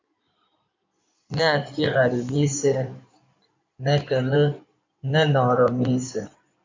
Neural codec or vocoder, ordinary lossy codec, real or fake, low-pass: codec, 16 kHz in and 24 kHz out, 2.2 kbps, FireRedTTS-2 codec; MP3, 64 kbps; fake; 7.2 kHz